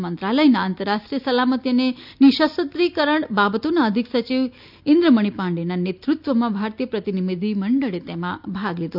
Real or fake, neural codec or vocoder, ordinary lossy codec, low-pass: real; none; none; 5.4 kHz